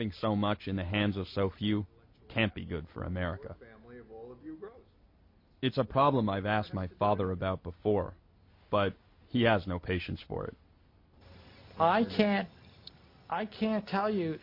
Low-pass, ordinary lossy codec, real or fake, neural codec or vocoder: 5.4 kHz; MP3, 32 kbps; real; none